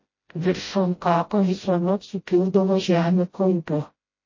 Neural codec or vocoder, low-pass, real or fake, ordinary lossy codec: codec, 16 kHz, 0.5 kbps, FreqCodec, smaller model; 7.2 kHz; fake; MP3, 32 kbps